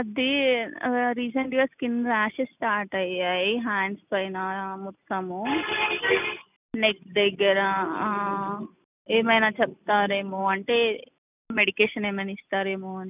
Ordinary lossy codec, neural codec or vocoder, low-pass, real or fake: none; none; 3.6 kHz; real